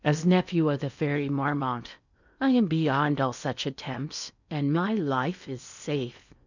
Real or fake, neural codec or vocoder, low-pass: fake; codec, 16 kHz in and 24 kHz out, 0.8 kbps, FocalCodec, streaming, 65536 codes; 7.2 kHz